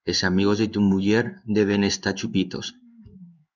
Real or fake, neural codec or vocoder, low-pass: fake; codec, 16 kHz in and 24 kHz out, 1 kbps, XY-Tokenizer; 7.2 kHz